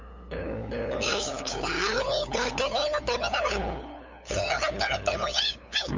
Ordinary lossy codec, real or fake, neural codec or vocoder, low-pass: MP3, 64 kbps; fake; codec, 16 kHz, 8 kbps, FunCodec, trained on LibriTTS, 25 frames a second; 7.2 kHz